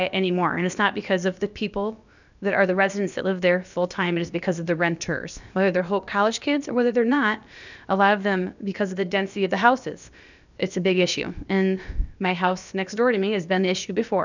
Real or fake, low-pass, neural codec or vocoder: fake; 7.2 kHz; codec, 16 kHz, about 1 kbps, DyCAST, with the encoder's durations